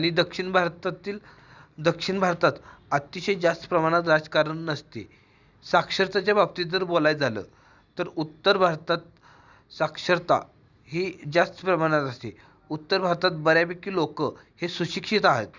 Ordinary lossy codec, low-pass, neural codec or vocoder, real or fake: Opus, 64 kbps; 7.2 kHz; none; real